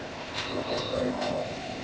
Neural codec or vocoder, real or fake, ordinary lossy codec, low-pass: codec, 16 kHz, 0.8 kbps, ZipCodec; fake; none; none